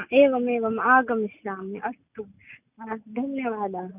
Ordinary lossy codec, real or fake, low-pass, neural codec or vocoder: none; real; 3.6 kHz; none